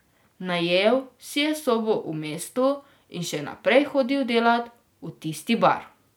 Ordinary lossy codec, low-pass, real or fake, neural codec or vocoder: none; none; real; none